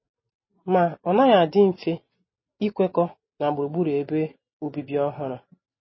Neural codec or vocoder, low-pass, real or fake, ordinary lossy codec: none; 7.2 kHz; real; MP3, 24 kbps